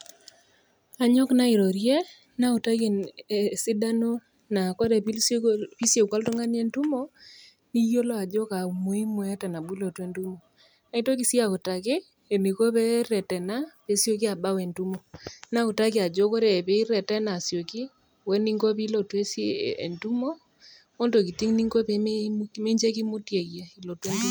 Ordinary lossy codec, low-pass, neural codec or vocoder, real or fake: none; none; none; real